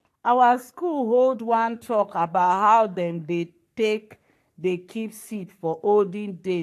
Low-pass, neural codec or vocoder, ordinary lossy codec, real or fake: 14.4 kHz; codec, 44.1 kHz, 3.4 kbps, Pupu-Codec; AAC, 64 kbps; fake